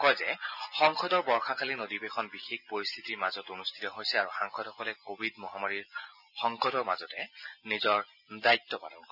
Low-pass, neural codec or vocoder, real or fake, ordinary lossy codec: 5.4 kHz; none; real; none